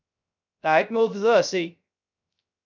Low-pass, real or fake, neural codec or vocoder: 7.2 kHz; fake; codec, 16 kHz, 0.3 kbps, FocalCodec